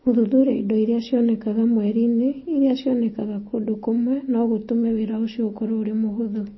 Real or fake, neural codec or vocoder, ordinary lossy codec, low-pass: real; none; MP3, 24 kbps; 7.2 kHz